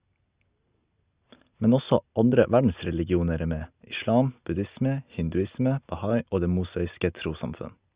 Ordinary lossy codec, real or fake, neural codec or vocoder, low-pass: Opus, 64 kbps; real; none; 3.6 kHz